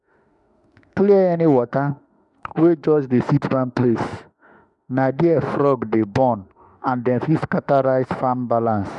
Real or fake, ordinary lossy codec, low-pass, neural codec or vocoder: fake; none; 10.8 kHz; autoencoder, 48 kHz, 32 numbers a frame, DAC-VAE, trained on Japanese speech